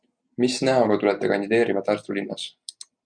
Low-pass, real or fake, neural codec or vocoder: 9.9 kHz; real; none